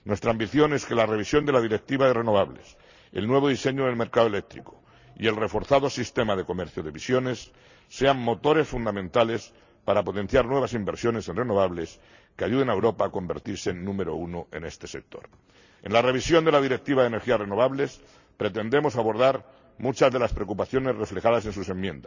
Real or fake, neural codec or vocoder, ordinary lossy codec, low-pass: real; none; none; 7.2 kHz